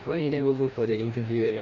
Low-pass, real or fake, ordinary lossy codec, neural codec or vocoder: 7.2 kHz; fake; none; codec, 16 kHz, 1 kbps, FreqCodec, larger model